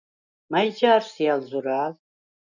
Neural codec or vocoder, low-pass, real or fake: none; 7.2 kHz; real